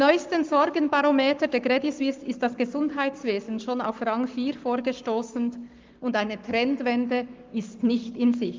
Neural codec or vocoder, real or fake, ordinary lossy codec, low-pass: codec, 44.1 kHz, 7.8 kbps, Pupu-Codec; fake; Opus, 24 kbps; 7.2 kHz